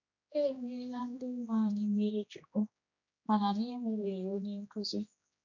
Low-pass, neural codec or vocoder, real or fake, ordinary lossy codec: 7.2 kHz; codec, 16 kHz, 1 kbps, X-Codec, HuBERT features, trained on general audio; fake; none